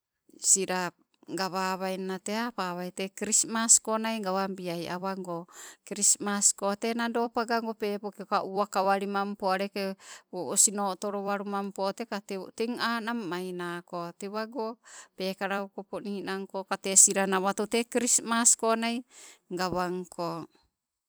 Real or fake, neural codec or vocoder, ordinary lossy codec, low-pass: fake; vocoder, 44.1 kHz, 128 mel bands every 256 samples, BigVGAN v2; none; none